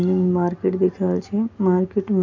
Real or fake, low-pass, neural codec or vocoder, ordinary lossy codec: real; 7.2 kHz; none; none